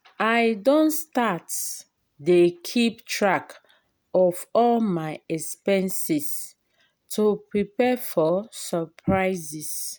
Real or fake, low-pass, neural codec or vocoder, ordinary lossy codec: real; none; none; none